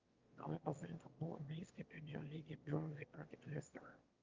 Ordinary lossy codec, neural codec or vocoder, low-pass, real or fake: Opus, 32 kbps; autoencoder, 22.05 kHz, a latent of 192 numbers a frame, VITS, trained on one speaker; 7.2 kHz; fake